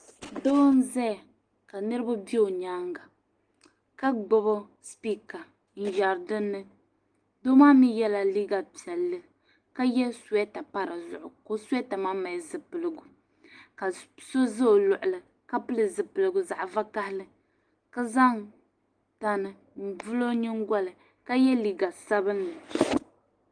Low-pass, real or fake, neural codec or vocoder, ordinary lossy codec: 9.9 kHz; real; none; Opus, 24 kbps